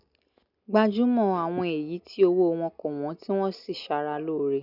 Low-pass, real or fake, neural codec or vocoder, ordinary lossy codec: 5.4 kHz; real; none; none